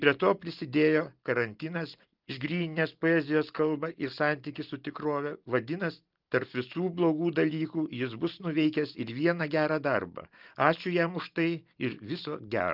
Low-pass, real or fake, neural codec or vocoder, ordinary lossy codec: 5.4 kHz; real; none; Opus, 32 kbps